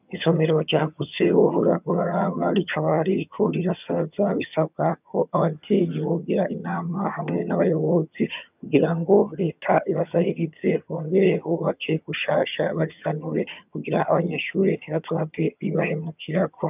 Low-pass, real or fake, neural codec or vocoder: 3.6 kHz; fake; vocoder, 22.05 kHz, 80 mel bands, HiFi-GAN